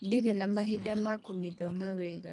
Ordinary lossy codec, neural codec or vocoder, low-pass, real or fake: none; codec, 24 kHz, 1.5 kbps, HILCodec; 10.8 kHz; fake